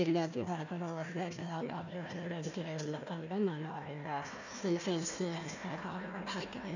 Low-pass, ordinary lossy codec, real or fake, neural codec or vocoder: 7.2 kHz; none; fake; codec, 16 kHz, 1 kbps, FunCodec, trained on Chinese and English, 50 frames a second